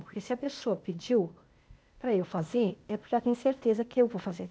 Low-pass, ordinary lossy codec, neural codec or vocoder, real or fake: none; none; codec, 16 kHz, 0.8 kbps, ZipCodec; fake